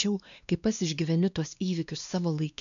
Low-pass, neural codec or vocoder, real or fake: 7.2 kHz; codec, 16 kHz, 2 kbps, X-Codec, WavLM features, trained on Multilingual LibriSpeech; fake